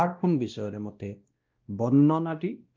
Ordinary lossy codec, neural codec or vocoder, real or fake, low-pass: Opus, 24 kbps; codec, 16 kHz, 1 kbps, X-Codec, WavLM features, trained on Multilingual LibriSpeech; fake; 7.2 kHz